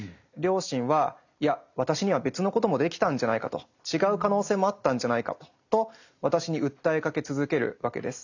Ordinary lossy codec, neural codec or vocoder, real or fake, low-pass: none; none; real; 7.2 kHz